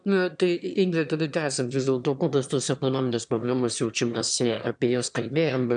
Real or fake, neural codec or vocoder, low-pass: fake; autoencoder, 22.05 kHz, a latent of 192 numbers a frame, VITS, trained on one speaker; 9.9 kHz